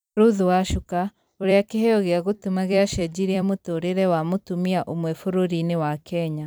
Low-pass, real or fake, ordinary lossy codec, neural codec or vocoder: none; fake; none; vocoder, 44.1 kHz, 128 mel bands every 256 samples, BigVGAN v2